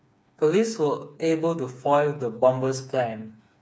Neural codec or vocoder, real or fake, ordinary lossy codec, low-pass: codec, 16 kHz, 4 kbps, FreqCodec, smaller model; fake; none; none